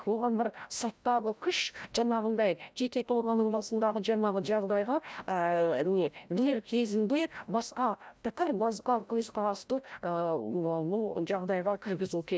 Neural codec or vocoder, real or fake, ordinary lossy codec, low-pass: codec, 16 kHz, 0.5 kbps, FreqCodec, larger model; fake; none; none